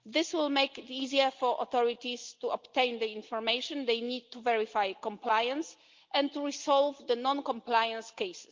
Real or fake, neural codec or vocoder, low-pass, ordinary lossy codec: real; none; 7.2 kHz; Opus, 32 kbps